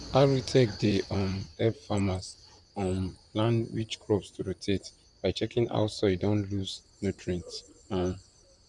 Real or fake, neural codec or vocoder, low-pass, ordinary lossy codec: fake; vocoder, 44.1 kHz, 128 mel bands, Pupu-Vocoder; 10.8 kHz; none